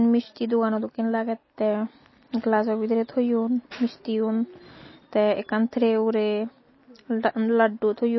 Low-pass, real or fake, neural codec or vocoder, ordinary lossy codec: 7.2 kHz; real; none; MP3, 24 kbps